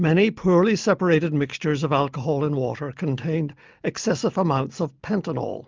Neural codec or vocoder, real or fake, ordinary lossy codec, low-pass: none; real; Opus, 24 kbps; 7.2 kHz